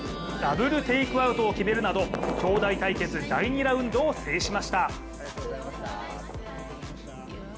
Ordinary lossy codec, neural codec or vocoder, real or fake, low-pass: none; none; real; none